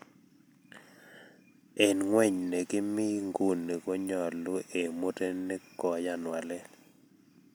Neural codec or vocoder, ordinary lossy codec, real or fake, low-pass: none; none; real; none